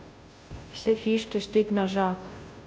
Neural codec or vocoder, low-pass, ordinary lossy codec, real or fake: codec, 16 kHz, 0.5 kbps, FunCodec, trained on Chinese and English, 25 frames a second; none; none; fake